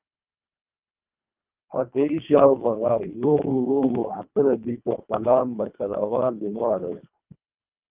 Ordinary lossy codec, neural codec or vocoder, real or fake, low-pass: Opus, 24 kbps; codec, 24 kHz, 1.5 kbps, HILCodec; fake; 3.6 kHz